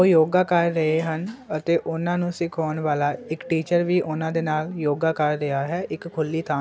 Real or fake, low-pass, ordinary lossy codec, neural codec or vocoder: real; none; none; none